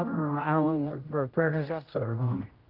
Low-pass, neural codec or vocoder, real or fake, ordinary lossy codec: 5.4 kHz; codec, 16 kHz, 0.5 kbps, X-Codec, HuBERT features, trained on general audio; fake; Opus, 24 kbps